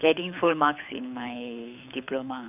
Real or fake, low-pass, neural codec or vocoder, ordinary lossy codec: fake; 3.6 kHz; codec, 24 kHz, 6 kbps, HILCodec; none